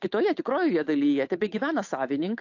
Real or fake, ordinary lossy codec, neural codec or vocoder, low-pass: fake; AAC, 48 kbps; codec, 16 kHz, 8 kbps, FunCodec, trained on Chinese and English, 25 frames a second; 7.2 kHz